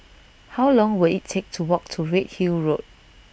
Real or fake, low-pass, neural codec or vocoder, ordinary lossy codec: real; none; none; none